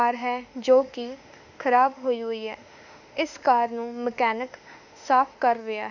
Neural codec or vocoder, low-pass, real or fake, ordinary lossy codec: autoencoder, 48 kHz, 32 numbers a frame, DAC-VAE, trained on Japanese speech; 7.2 kHz; fake; none